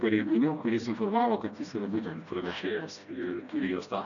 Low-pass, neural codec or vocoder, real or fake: 7.2 kHz; codec, 16 kHz, 1 kbps, FreqCodec, smaller model; fake